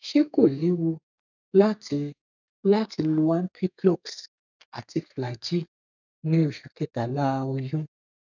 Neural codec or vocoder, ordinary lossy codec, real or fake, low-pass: codec, 32 kHz, 1.9 kbps, SNAC; none; fake; 7.2 kHz